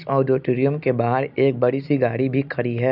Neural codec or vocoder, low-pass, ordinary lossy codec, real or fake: none; 5.4 kHz; none; real